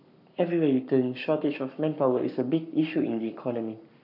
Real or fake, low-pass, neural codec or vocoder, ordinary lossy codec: fake; 5.4 kHz; codec, 44.1 kHz, 7.8 kbps, Pupu-Codec; none